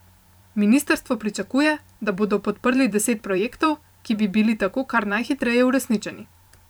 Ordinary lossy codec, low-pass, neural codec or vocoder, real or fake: none; none; vocoder, 44.1 kHz, 128 mel bands every 512 samples, BigVGAN v2; fake